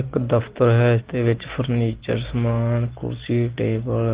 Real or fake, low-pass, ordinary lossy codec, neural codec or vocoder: real; 3.6 kHz; Opus, 16 kbps; none